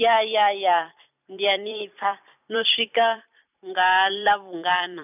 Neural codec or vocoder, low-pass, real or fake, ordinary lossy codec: vocoder, 44.1 kHz, 128 mel bands every 256 samples, BigVGAN v2; 3.6 kHz; fake; none